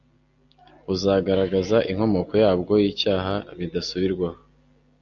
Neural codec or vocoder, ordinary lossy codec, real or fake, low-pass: none; AAC, 64 kbps; real; 7.2 kHz